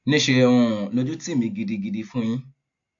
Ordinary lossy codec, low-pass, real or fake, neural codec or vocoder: AAC, 48 kbps; 7.2 kHz; real; none